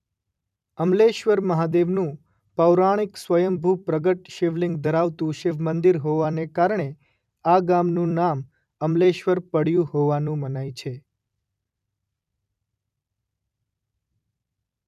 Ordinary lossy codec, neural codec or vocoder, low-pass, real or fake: none; vocoder, 44.1 kHz, 128 mel bands every 256 samples, BigVGAN v2; 14.4 kHz; fake